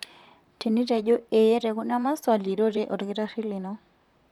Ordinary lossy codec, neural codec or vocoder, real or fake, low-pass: none; vocoder, 44.1 kHz, 128 mel bands, Pupu-Vocoder; fake; 19.8 kHz